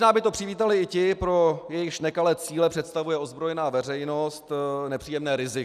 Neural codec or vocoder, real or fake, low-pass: none; real; 14.4 kHz